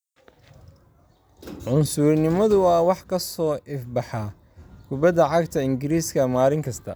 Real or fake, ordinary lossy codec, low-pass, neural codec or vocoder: real; none; none; none